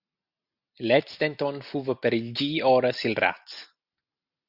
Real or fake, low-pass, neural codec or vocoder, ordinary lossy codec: real; 5.4 kHz; none; Opus, 64 kbps